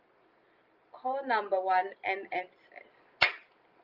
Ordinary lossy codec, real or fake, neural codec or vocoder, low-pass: Opus, 32 kbps; real; none; 5.4 kHz